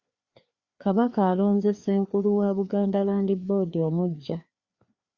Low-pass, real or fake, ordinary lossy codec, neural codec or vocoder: 7.2 kHz; fake; Opus, 64 kbps; codec, 16 kHz, 2 kbps, FreqCodec, larger model